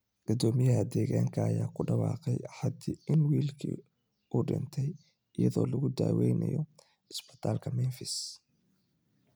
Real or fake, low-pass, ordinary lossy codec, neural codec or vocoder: real; none; none; none